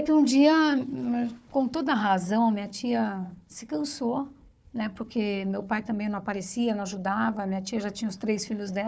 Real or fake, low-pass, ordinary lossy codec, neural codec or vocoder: fake; none; none; codec, 16 kHz, 4 kbps, FunCodec, trained on Chinese and English, 50 frames a second